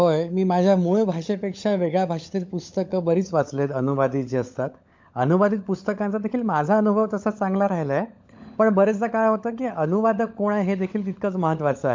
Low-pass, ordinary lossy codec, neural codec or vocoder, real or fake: 7.2 kHz; MP3, 48 kbps; codec, 16 kHz, 16 kbps, FunCodec, trained on LibriTTS, 50 frames a second; fake